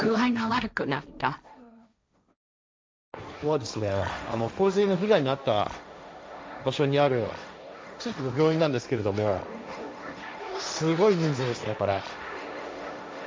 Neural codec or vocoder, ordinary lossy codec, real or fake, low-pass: codec, 16 kHz, 1.1 kbps, Voila-Tokenizer; none; fake; none